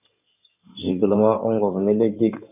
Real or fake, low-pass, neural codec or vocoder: fake; 3.6 kHz; codec, 16 kHz in and 24 kHz out, 2.2 kbps, FireRedTTS-2 codec